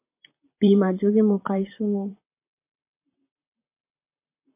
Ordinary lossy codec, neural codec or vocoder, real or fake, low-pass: MP3, 24 kbps; vocoder, 44.1 kHz, 128 mel bands, Pupu-Vocoder; fake; 3.6 kHz